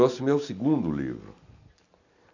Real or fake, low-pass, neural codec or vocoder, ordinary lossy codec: real; 7.2 kHz; none; none